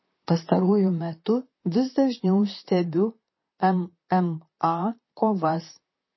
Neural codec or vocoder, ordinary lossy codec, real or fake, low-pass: vocoder, 44.1 kHz, 128 mel bands, Pupu-Vocoder; MP3, 24 kbps; fake; 7.2 kHz